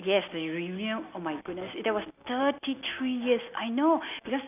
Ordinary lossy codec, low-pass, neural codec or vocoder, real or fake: none; 3.6 kHz; none; real